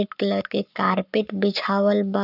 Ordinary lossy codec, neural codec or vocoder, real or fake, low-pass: none; codec, 44.1 kHz, 7.8 kbps, Pupu-Codec; fake; 5.4 kHz